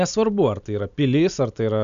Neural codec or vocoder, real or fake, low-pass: none; real; 7.2 kHz